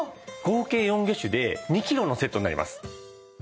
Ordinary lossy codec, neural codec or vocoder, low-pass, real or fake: none; none; none; real